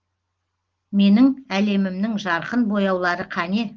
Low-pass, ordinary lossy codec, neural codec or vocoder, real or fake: 7.2 kHz; Opus, 16 kbps; none; real